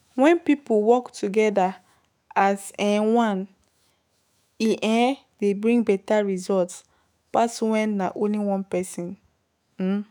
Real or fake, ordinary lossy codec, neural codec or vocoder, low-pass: fake; none; autoencoder, 48 kHz, 128 numbers a frame, DAC-VAE, trained on Japanese speech; none